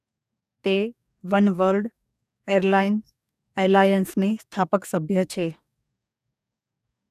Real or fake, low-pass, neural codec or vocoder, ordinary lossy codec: fake; 14.4 kHz; codec, 44.1 kHz, 2.6 kbps, DAC; none